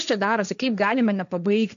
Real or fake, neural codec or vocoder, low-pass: fake; codec, 16 kHz, 1.1 kbps, Voila-Tokenizer; 7.2 kHz